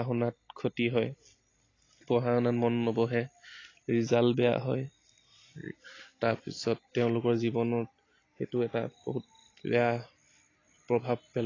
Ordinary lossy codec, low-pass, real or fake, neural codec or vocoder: AAC, 32 kbps; 7.2 kHz; real; none